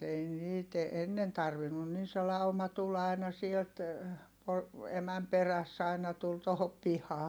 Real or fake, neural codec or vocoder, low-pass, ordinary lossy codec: real; none; none; none